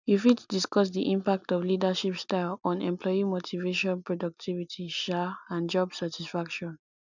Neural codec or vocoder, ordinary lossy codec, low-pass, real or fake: none; none; 7.2 kHz; real